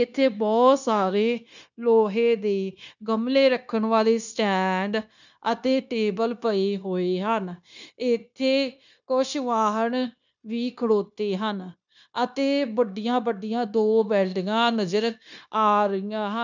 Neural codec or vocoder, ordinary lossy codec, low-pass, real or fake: codec, 16 kHz, 0.9 kbps, LongCat-Audio-Codec; none; 7.2 kHz; fake